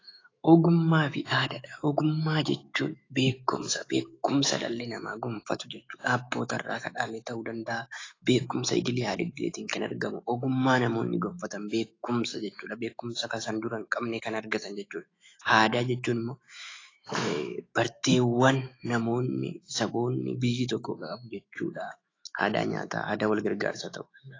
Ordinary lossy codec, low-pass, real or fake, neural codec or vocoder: AAC, 32 kbps; 7.2 kHz; fake; autoencoder, 48 kHz, 128 numbers a frame, DAC-VAE, trained on Japanese speech